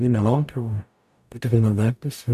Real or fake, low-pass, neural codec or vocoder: fake; 14.4 kHz; codec, 44.1 kHz, 0.9 kbps, DAC